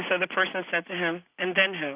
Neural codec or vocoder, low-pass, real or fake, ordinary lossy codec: none; 3.6 kHz; real; Opus, 64 kbps